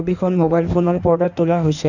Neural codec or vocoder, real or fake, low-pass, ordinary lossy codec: codec, 16 kHz in and 24 kHz out, 1.1 kbps, FireRedTTS-2 codec; fake; 7.2 kHz; none